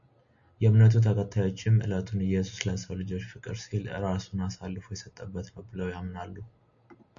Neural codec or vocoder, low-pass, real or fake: none; 7.2 kHz; real